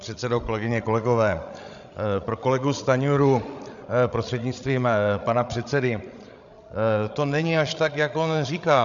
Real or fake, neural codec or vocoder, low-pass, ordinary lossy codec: fake; codec, 16 kHz, 16 kbps, FreqCodec, larger model; 7.2 kHz; MP3, 96 kbps